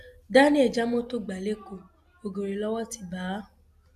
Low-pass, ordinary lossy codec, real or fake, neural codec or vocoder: 14.4 kHz; none; real; none